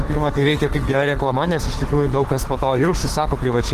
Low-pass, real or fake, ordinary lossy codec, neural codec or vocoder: 14.4 kHz; fake; Opus, 16 kbps; autoencoder, 48 kHz, 32 numbers a frame, DAC-VAE, trained on Japanese speech